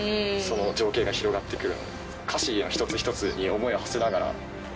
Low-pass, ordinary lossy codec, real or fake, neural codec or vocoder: none; none; real; none